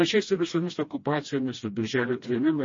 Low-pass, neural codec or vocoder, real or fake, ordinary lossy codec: 7.2 kHz; codec, 16 kHz, 1 kbps, FreqCodec, smaller model; fake; MP3, 32 kbps